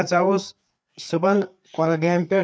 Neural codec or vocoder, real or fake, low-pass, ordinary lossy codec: codec, 16 kHz, 4 kbps, FreqCodec, larger model; fake; none; none